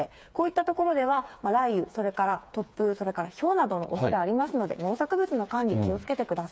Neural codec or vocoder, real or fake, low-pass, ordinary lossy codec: codec, 16 kHz, 4 kbps, FreqCodec, smaller model; fake; none; none